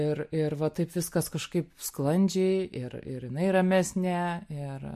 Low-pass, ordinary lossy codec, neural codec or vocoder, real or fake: 14.4 kHz; MP3, 64 kbps; none; real